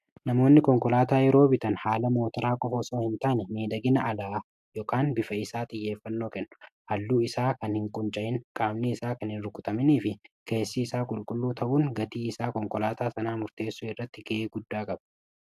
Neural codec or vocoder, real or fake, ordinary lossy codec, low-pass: none; real; AAC, 96 kbps; 14.4 kHz